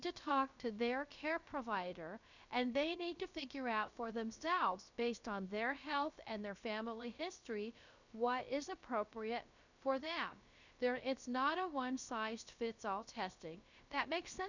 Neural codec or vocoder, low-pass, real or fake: codec, 16 kHz, about 1 kbps, DyCAST, with the encoder's durations; 7.2 kHz; fake